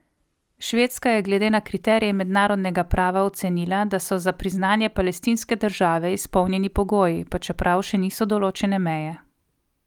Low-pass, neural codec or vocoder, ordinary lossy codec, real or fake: 19.8 kHz; none; Opus, 32 kbps; real